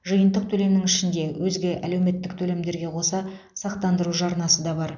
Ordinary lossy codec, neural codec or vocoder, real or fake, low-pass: none; none; real; 7.2 kHz